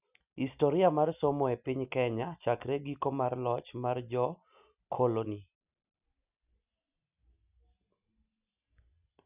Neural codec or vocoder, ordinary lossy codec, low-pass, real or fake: none; none; 3.6 kHz; real